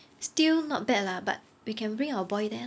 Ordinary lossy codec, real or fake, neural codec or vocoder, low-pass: none; real; none; none